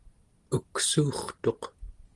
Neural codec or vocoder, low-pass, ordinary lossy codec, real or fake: none; 10.8 kHz; Opus, 24 kbps; real